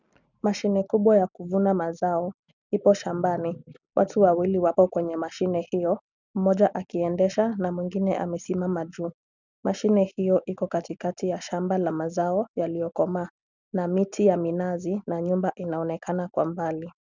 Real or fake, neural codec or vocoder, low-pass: real; none; 7.2 kHz